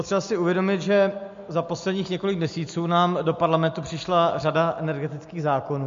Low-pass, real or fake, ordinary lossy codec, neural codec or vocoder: 7.2 kHz; real; MP3, 48 kbps; none